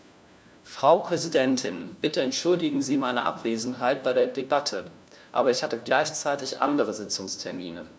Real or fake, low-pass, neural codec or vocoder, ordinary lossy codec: fake; none; codec, 16 kHz, 1 kbps, FunCodec, trained on LibriTTS, 50 frames a second; none